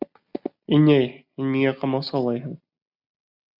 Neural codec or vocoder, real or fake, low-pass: none; real; 5.4 kHz